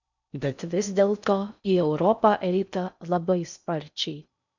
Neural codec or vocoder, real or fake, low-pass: codec, 16 kHz in and 24 kHz out, 0.6 kbps, FocalCodec, streaming, 4096 codes; fake; 7.2 kHz